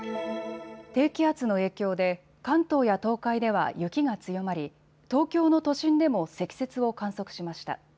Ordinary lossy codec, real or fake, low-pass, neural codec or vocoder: none; real; none; none